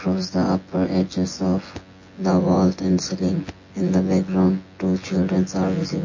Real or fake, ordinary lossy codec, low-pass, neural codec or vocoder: fake; MP3, 32 kbps; 7.2 kHz; vocoder, 24 kHz, 100 mel bands, Vocos